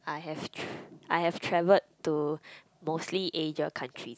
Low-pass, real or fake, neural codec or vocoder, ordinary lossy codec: none; real; none; none